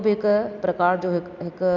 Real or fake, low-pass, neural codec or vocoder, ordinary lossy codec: real; 7.2 kHz; none; none